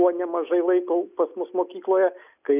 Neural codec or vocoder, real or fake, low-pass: none; real; 3.6 kHz